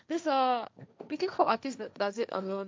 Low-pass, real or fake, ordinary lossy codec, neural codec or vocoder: none; fake; none; codec, 16 kHz, 1.1 kbps, Voila-Tokenizer